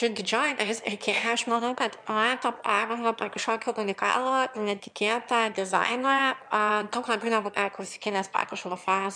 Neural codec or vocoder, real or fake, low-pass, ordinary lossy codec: autoencoder, 22.05 kHz, a latent of 192 numbers a frame, VITS, trained on one speaker; fake; 9.9 kHz; MP3, 96 kbps